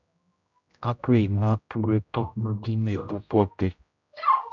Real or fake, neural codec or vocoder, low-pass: fake; codec, 16 kHz, 0.5 kbps, X-Codec, HuBERT features, trained on general audio; 7.2 kHz